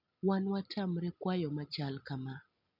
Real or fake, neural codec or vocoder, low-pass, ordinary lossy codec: real; none; 5.4 kHz; none